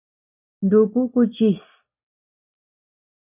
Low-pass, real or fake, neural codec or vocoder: 3.6 kHz; real; none